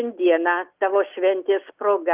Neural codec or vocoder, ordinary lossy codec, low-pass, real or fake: none; Opus, 32 kbps; 3.6 kHz; real